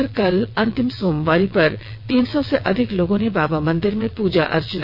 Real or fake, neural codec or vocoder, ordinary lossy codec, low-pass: fake; vocoder, 22.05 kHz, 80 mel bands, WaveNeXt; AAC, 48 kbps; 5.4 kHz